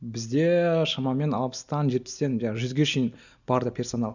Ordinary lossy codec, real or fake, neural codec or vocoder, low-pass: none; real; none; 7.2 kHz